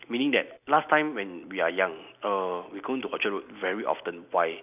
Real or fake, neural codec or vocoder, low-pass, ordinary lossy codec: real; none; 3.6 kHz; none